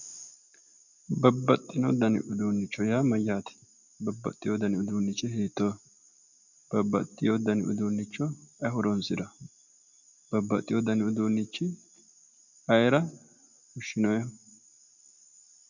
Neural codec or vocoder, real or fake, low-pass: none; real; 7.2 kHz